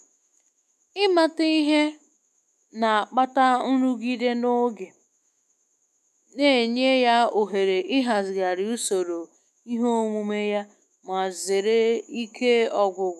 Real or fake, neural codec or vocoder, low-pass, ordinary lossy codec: fake; autoencoder, 48 kHz, 128 numbers a frame, DAC-VAE, trained on Japanese speech; 14.4 kHz; none